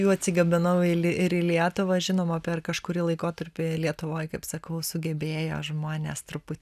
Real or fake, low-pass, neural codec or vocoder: real; 14.4 kHz; none